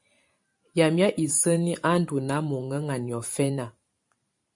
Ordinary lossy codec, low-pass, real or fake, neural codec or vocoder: MP3, 48 kbps; 10.8 kHz; fake; vocoder, 44.1 kHz, 128 mel bands every 512 samples, BigVGAN v2